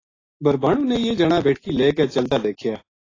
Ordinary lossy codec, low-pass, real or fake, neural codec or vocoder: AAC, 32 kbps; 7.2 kHz; real; none